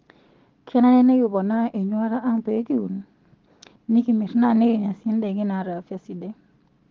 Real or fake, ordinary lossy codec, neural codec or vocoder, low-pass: real; Opus, 16 kbps; none; 7.2 kHz